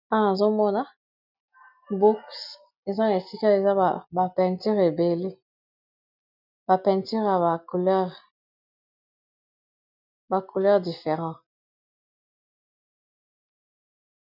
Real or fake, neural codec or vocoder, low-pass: real; none; 5.4 kHz